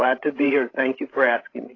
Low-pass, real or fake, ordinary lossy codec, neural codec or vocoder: 7.2 kHz; fake; AAC, 32 kbps; codec, 16 kHz, 16 kbps, FreqCodec, larger model